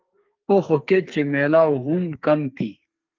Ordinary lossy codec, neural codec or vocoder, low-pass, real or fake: Opus, 32 kbps; codec, 32 kHz, 1.9 kbps, SNAC; 7.2 kHz; fake